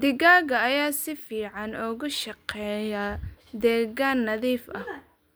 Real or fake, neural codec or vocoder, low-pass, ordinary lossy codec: real; none; none; none